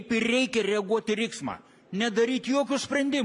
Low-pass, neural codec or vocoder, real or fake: 10.8 kHz; none; real